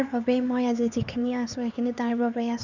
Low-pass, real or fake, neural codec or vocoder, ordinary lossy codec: 7.2 kHz; fake; codec, 16 kHz, 4 kbps, X-Codec, HuBERT features, trained on LibriSpeech; none